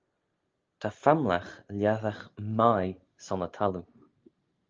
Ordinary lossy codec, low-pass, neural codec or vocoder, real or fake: Opus, 24 kbps; 7.2 kHz; none; real